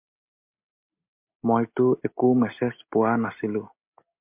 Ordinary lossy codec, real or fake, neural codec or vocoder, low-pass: MP3, 32 kbps; real; none; 3.6 kHz